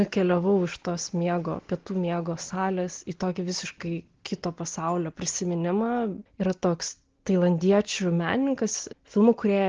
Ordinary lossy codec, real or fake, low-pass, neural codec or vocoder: Opus, 16 kbps; real; 7.2 kHz; none